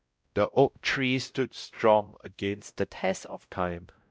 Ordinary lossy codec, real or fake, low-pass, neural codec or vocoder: none; fake; none; codec, 16 kHz, 0.5 kbps, X-Codec, WavLM features, trained on Multilingual LibriSpeech